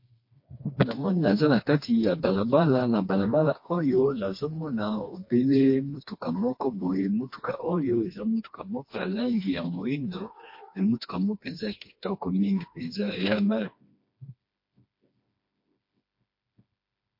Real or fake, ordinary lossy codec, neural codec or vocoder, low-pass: fake; MP3, 32 kbps; codec, 16 kHz, 2 kbps, FreqCodec, smaller model; 5.4 kHz